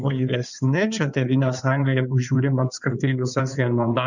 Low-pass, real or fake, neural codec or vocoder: 7.2 kHz; fake; codec, 16 kHz in and 24 kHz out, 2.2 kbps, FireRedTTS-2 codec